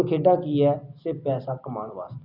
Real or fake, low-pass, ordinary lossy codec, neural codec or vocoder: real; 5.4 kHz; Opus, 64 kbps; none